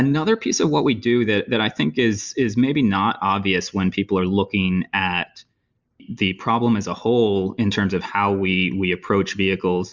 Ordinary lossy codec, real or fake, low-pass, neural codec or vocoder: Opus, 64 kbps; real; 7.2 kHz; none